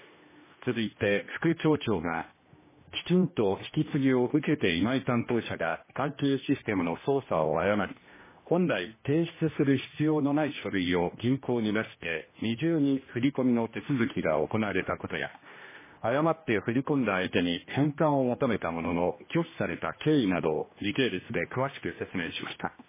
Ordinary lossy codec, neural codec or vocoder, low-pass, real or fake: MP3, 16 kbps; codec, 16 kHz, 1 kbps, X-Codec, HuBERT features, trained on general audio; 3.6 kHz; fake